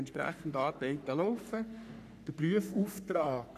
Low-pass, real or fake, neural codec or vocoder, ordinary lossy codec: 14.4 kHz; fake; codec, 44.1 kHz, 3.4 kbps, Pupu-Codec; none